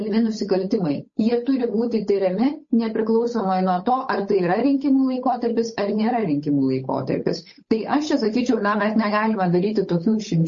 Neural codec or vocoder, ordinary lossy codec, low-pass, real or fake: codec, 16 kHz, 8 kbps, FunCodec, trained on Chinese and English, 25 frames a second; MP3, 32 kbps; 7.2 kHz; fake